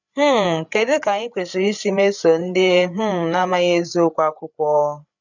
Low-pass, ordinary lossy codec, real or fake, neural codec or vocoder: 7.2 kHz; none; fake; codec, 16 kHz, 8 kbps, FreqCodec, larger model